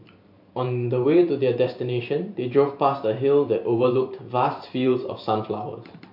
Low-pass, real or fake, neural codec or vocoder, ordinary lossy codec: 5.4 kHz; fake; vocoder, 44.1 kHz, 128 mel bands every 512 samples, BigVGAN v2; MP3, 48 kbps